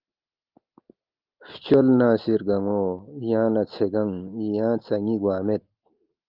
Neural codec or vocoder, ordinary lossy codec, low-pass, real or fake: none; Opus, 24 kbps; 5.4 kHz; real